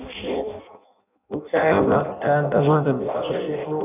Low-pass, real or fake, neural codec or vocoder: 3.6 kHz; fake; codec, 16 kHz in and 24 kHz out, 0.6 kbps, FireRedTTS-2 codec